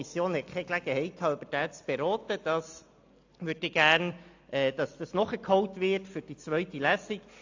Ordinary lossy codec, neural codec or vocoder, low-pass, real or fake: AAC, 48 kbps; none; 7.2 kHz; real